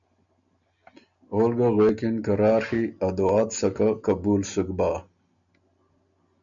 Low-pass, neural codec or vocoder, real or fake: 7.2 kHz; none; real